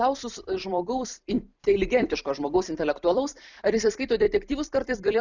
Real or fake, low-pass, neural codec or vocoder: fake; 7.2 kHz; vocoder, 44.1 kHz, 128 mel bands every 256 samples, BigVGAN v2